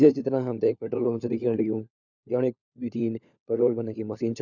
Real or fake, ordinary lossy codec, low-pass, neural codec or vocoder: fake; none; 7.2 kHz; codec, 16 kHz, 4 kbps, FunCodec, trained on LibriTTS, 50 frames a second